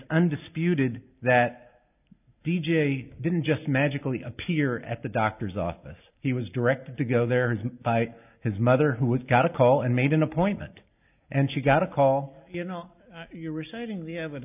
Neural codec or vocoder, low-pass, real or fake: none; 3.6 kHz; real